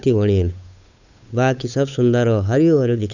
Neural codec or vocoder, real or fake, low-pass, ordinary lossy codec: codec, 16 kHz, 8 kbps, FunCodec, trained on Chinese and English, 25 frames a second; fake; 7.2 kHz; none